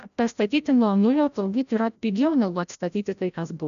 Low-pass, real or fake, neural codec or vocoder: 7.2 kHz; fake; codec, 16 kHz, 0.5 kbps, FreqCodec, larger model